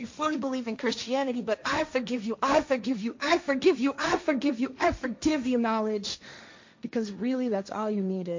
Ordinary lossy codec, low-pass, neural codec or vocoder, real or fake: MP3, 48 kbps; 7.2 kHz; codec, 16 kHz, 1.1 kbps, Voila-Tokenizer; fake